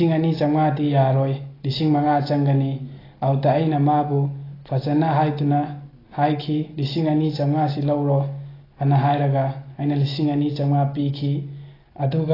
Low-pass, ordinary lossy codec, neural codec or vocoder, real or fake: 5.4 kHz; AAC, 24 kbps; none; real